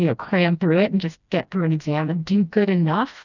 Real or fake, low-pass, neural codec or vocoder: fake; 7.2 kHz; codec, 16 kHz, 1 kbps, FreqCodec, smaller model